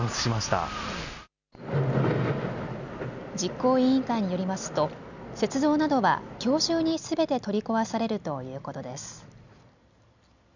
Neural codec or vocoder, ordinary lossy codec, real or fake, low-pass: none; none; real; 7.2 kHz